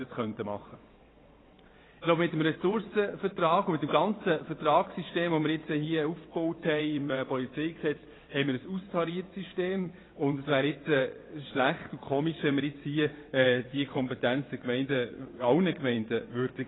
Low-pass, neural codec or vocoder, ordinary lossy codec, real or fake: 7.2 kHz; vocoder, 22.05 kHz, 80 mel bands, WaveNeXt; AAC, 16 kbps; fake